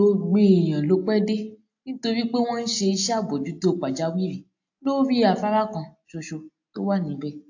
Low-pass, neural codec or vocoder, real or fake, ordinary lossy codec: 7.2 kHz; none; real; AAC, 48 kbps